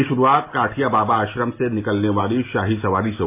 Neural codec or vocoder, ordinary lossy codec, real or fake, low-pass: none; MP3, 24 kbps; real; 3.6 kHz